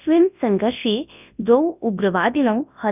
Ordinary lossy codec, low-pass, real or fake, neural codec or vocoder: none; 3.6 kHz; fake; codec, 24 kHz, 0.9 kbps, WavTokenizer, large speech release